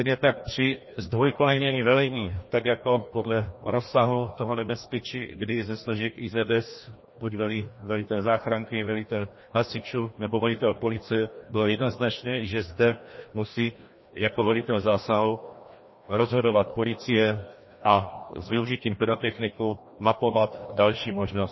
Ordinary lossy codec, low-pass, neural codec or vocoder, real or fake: MP3, 24 kbps; 7.2 kHz; codec, 16 kHz, 1 kbps, FreqCodec, larger model; fake